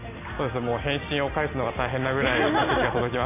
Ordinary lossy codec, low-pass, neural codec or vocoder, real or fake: none; 3.6 kHz; none; real